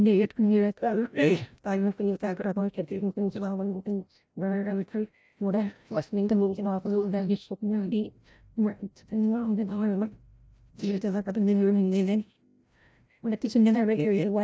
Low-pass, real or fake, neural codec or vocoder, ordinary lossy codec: none; fake; codec, 16 kHz, 0.5 kbps, FreqCodec, larger model; none